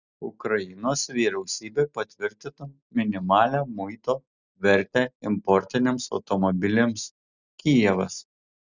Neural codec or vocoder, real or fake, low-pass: none; real; 7.2 kHz